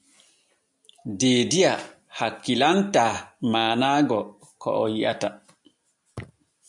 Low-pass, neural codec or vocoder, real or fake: 10.8 kHz; none; real